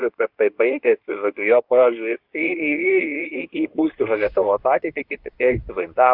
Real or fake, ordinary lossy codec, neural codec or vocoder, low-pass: fake; Opus, 64 kbps; codec, 24 kHz, 0.9 kbps, WavTokenizer, medium speech release version 2; 5.4 kHz